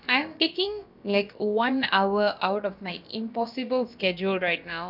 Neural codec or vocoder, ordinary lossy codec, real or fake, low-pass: codec, 16 kHz, about 1 kbps, DyCAST, with the encoder's durations; none; fake; 5.4 kHz